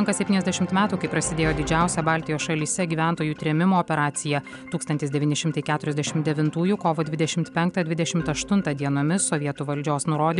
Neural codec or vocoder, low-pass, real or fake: none; 10.8 kHz; real